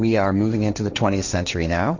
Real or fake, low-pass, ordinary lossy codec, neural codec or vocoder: fake; 7.2 kHz; Opus, 64 kbps; codec, 16 kHz, 1.1 kbps, Voila-Tokenizer